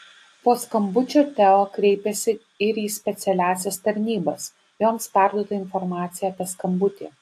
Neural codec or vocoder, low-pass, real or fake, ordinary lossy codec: none; 14.4 kHz; real; AAC, 64 kbps